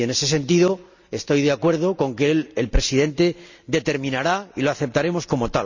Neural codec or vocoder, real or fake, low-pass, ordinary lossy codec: none; real; 7.2 kHz; none